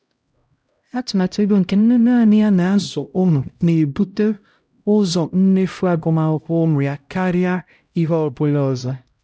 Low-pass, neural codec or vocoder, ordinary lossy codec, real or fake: none; codec, 16 kHz, 0.5 kbps, X-Codec, HuBERT features, trained on LibriSpeech; none; fake